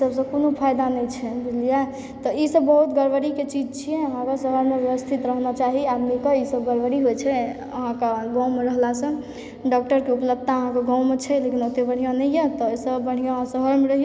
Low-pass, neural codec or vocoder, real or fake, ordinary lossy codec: none; none; real; none